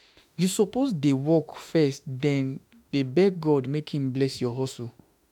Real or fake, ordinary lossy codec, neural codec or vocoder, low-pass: fake; none; autoencoder, 48 kHz, 32 numbers a frame, DAC-VAE, trained on Japanese speech; 19.8 kHz